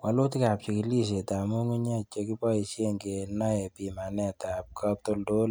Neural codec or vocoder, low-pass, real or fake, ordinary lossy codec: none; none; real; none